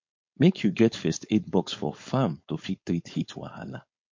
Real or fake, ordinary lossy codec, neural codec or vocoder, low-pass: fake; MP3, 48 kbps; codec, 16 kHz, 4.8 kbps, FACodec; 7.2 kHz